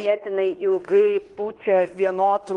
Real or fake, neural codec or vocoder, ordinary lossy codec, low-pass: fake; codec, 16 kHz in and 24 kHz out, 0.9 kbps, LongCat-Audio-Codec, fine tuned four codebook decoder; Opus, 24 kbps; 10.8 kHz